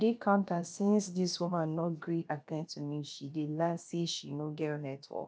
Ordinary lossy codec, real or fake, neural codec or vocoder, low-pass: none; fake; codec, 16 kHz, about 1 kbps, DyCAST, with the encoder's durations; none